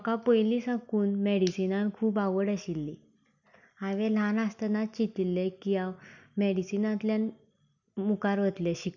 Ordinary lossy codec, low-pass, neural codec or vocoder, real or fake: none; 7.2 kHz; none; real